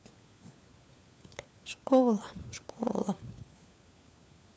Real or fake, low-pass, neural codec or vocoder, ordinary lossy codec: fake; none; codec, 16 kHz, 4 kbps, FunCodec, trained on LibriTTS, 50 frames a second; none